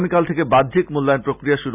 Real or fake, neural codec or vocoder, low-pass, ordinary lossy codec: real; none; 3.6 kHz; none